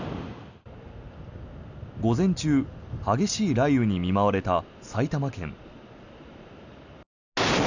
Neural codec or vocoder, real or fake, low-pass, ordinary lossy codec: none; real; 7.2 kHz; none